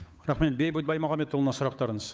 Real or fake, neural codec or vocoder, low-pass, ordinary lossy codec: fake; codec, 16 kHz, 8 kbps, FunCodec, trained on Chinese and English, 25 frames a second; none; none